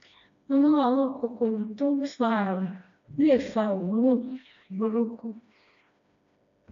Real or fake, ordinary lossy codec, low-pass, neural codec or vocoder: fake; AAC, 64 kbps; 7.2 kHz; codec, 16 kHz, 1 kbps, FreqCodec, smaller model